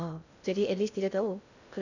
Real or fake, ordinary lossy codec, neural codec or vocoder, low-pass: fake; none; codec, 16 kHz in and 24 kHz out, 0.6 kbps, FocalCodec, streaming, 2048 codes; 7.2 kHz